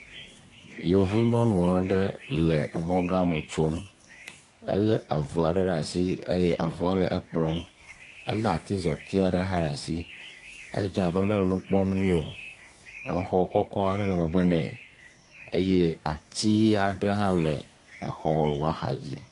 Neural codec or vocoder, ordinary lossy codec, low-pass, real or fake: codec, 24 kHz, 1 kbps, SNAC; AAC, 48 kbps; 10.8 kHz; fake